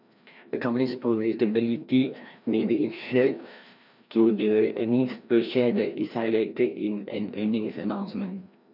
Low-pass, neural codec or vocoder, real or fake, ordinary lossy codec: 5.4 kHz; codec, 16 kHz, 1 kbps, FreqCodec, larger model; fake; none